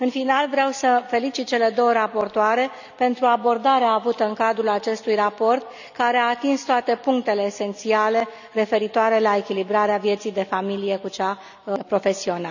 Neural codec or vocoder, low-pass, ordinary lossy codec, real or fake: none; 7.2 kHz; none; real